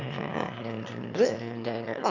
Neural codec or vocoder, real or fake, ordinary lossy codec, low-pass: autoencoder, 22.05 kHz, a latent of 192 numbers a frame, VITS, trained on one speaker; fake; none; 7.2 kHz